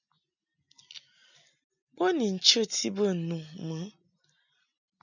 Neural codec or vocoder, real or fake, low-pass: none; real; 7.2 kHz